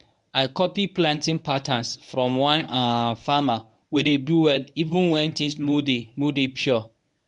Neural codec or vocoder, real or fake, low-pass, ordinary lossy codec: codec, 24 kHz, 0.9 kbps, WavTokenizer, medium speech release version 1; fake; 10.8 kHz; MP3, 96 kbps